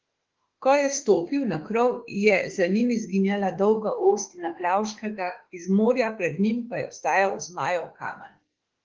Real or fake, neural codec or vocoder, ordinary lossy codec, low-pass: fake; autoencoder, 48 kHz, 32 numbers a frame, DAC-VAE, trained on Japanese speech; Opus, 16 kbps; 7.2 kHz